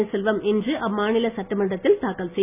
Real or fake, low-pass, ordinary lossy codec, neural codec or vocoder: real; 3.6 kHz; none; none